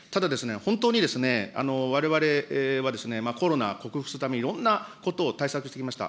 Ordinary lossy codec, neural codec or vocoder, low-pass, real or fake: none; none; none; real